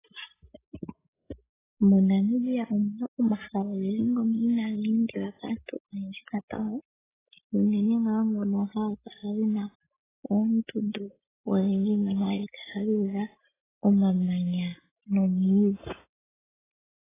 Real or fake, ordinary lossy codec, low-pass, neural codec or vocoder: real; AAC, 16 kbps; 3.6 kHz; none